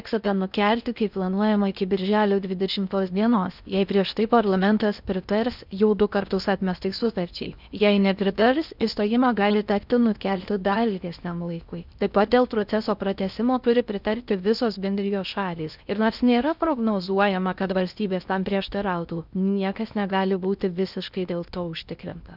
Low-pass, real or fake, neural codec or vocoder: 5.4 kHz; fake; codec, 16 kHz in and 24 kHz out, 0.6 kbps, FocalCodec, streaming, 4096 codes